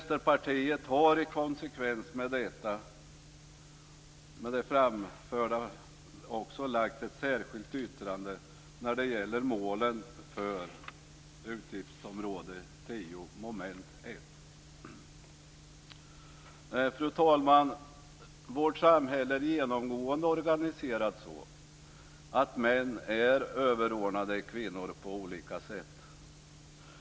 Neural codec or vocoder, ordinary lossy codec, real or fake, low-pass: none; none; real; none